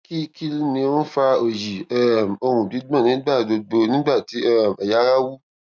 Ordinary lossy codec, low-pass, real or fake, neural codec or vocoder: none; none; real; none